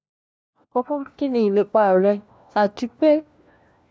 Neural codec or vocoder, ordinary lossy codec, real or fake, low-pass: codec, 16 kHz, 1 kbps, FunCodec, trained on LibriTTS, 50 frames a second; none; fake; none